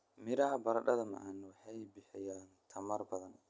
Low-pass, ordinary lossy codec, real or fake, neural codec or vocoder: none; none; real; none